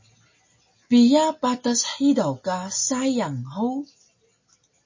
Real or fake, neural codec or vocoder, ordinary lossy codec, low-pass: real; none; MP3, 32 kbps; 7.2 kHz